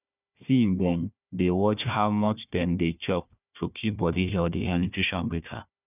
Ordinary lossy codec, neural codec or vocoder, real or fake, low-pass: none; codec, 16 kHz, 1 kbps, FunCodec, trained on Chinese and English, 50 frames a second; fake; 3.6 kHz